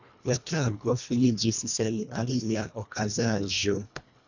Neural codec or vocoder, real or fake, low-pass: codec, 24 kHz, 1.5 kbps, HILCodec; fake; 7.2 kHz